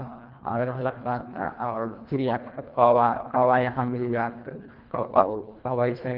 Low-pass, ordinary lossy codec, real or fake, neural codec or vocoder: 5.4 kHz; Opus, 32 kbps; fake; codec, 24 kHz, 1.5 kbps, HILCodec